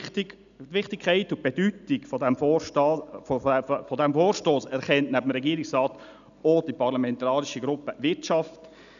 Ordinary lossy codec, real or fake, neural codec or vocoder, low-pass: none; real; none; 7.2 kHz